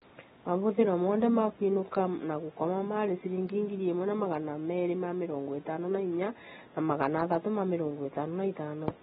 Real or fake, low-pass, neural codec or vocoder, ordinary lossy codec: real; 19.8 kHz; none; AAC, 16 kbps